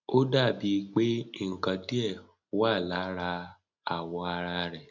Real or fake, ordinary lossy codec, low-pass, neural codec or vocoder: real; none; 7.2 kHz; none